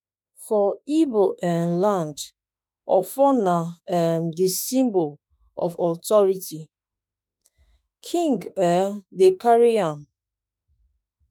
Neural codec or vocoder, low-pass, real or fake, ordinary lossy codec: autoencoder, 48 kHz, 32 numbers a frame, DAC-VAE, trained on Japanese speech; none; fake; none